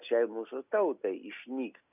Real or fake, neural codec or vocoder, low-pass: real; none; 3.6 kHz